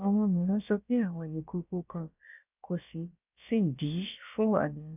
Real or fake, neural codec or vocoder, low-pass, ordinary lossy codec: fake; codec, 16 kHz, about 1 kbps, DyCAST, with the encoder's durations; 3.6 kHz; none